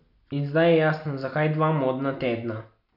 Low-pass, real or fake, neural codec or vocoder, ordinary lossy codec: 5.4 kHz; real; none; none